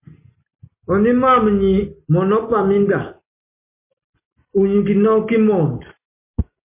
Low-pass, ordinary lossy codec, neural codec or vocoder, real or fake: 3.6 kHz; MP3, 24 kbps; none; real